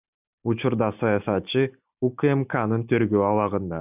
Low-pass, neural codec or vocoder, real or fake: 3.6 kHz; none; real